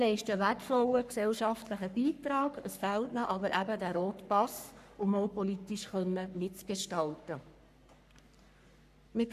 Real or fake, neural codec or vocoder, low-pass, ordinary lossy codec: fake; codec, 44.1 kHz, 3.4 kbps, Pupu-Codec; 14.4 kHz; none